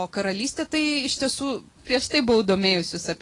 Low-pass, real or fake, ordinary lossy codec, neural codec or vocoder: 10.8 kHz; real; AAC, 32 kbps; none